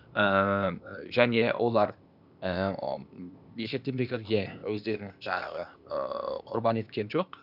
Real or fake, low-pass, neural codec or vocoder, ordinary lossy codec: fake; 5.4 kHz; codec, 16 kHz, 0.8 kbps, ZipCodec; none